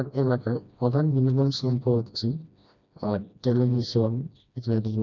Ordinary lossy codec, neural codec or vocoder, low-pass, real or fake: none; codec, 16 kHz, 1 kbps, FreqCodec, smaller model; 7.2 kHz; fake